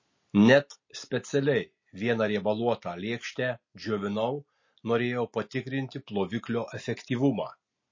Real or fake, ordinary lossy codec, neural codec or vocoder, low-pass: real; MP3, 32 kbps; none; 7.2 kHz